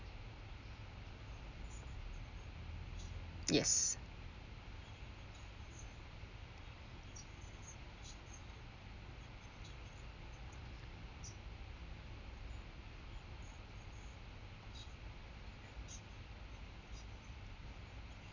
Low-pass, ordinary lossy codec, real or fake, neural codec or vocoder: 7.2 kHz; none; real; none